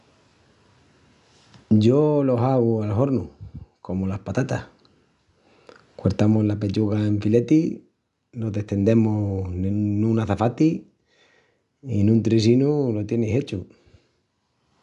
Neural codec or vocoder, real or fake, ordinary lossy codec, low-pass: none; real; none; 10.8 kHz